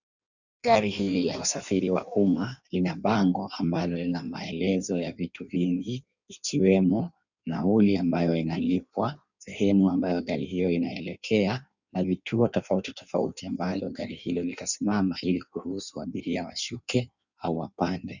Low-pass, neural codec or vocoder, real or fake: 7.2 kHz; codec, 16 kHz in and 24 kHz out, 1.1 kbps, FireRedTTS-2 codec; fake